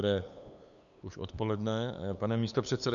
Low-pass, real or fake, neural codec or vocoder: 7.2 kHz; fake; codec, 16 kHz, 8 kbps, FunCodec, trained on LibriTTS, 25 frames a second